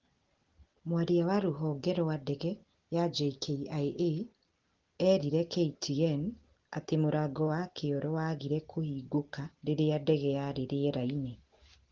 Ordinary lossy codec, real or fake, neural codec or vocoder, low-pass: Opus, 16 kbps; real; none; 7.2 kHz